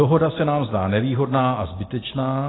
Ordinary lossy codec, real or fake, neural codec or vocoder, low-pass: AAC, 16 kbps; real; none; 7.2 kHz